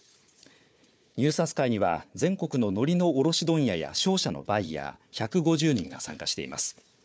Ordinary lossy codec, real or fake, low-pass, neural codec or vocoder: none; fake; none; codec, 16 kHz, 4 kbps, FunCodec, trained on Chinese and English, 50 frames a second